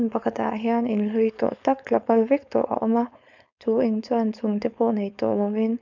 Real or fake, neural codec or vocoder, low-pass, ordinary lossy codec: fake; codec, 16 kHz, 4.8 kbps, FACodec; 7.2 kHz; none